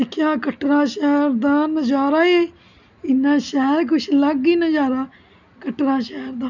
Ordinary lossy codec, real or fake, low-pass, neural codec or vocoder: none; real; 7.2 kHz; none